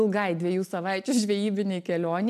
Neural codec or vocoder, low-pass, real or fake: none; 14.4 kHz; real